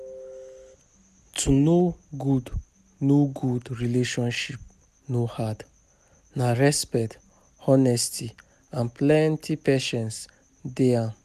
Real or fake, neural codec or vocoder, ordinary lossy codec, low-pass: real; none; none; 14.4 kHz